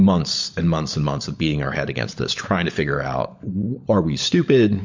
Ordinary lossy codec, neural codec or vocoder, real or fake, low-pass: MP3, 48 kbps; codec, 16 kHz, 16 kbps, FunCodec, trained on LibriTTS, 50 frames a second; fake; 7.2 kHz